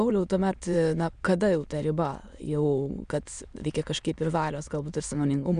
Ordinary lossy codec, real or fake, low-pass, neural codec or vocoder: Opus, 64 kbps; fake; 9.9 kHz; autoencoder, 22.05 kHz, a latent of 192 numbers a frame, VITS, trained on many speakers